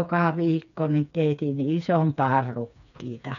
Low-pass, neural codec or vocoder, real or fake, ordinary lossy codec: 7.2 kHz; codec, 16 kHz, 4 kbps, FreqCodec, smaller model; fake; none